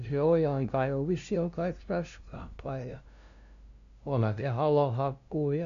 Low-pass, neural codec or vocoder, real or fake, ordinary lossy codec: 7.2 kHz; codec, 16 kHz, 0.5 kbps, FunCodec, trained on LibriTTS, 25 frames a second; fake; none